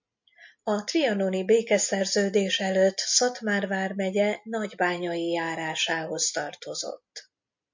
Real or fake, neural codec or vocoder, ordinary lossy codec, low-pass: real; none; MP3, 48 kbps; 7.2 kHz